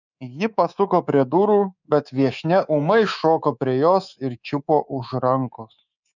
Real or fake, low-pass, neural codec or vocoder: fake; 7.2 kHz; codec, 24 kHz, 3.1 kbps, DualCodec